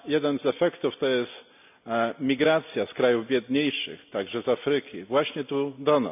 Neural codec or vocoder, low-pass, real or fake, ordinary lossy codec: none; 3.6 kHz; real; none